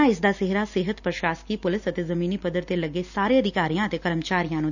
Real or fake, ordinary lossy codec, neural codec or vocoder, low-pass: real; none; none; 7.2 kHz